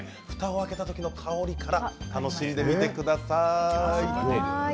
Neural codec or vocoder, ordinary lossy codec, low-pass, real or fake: none; none; none; real